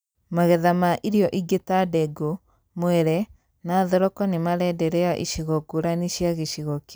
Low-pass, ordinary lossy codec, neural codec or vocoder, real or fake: none; none; none; real